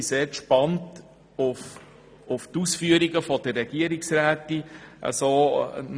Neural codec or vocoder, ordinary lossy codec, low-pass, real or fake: none; none; none; real